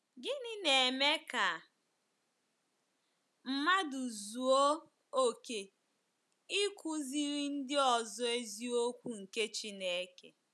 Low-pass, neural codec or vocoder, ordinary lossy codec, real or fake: none; none; none; real